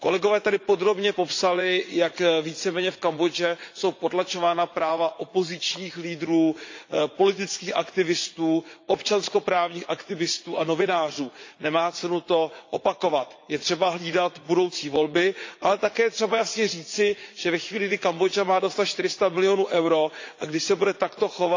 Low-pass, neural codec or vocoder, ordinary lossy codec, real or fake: 7.2 kHz; vocoder, 44.1 kHz, 80 mel bands, Vocos; AAC, 48 kbps; fake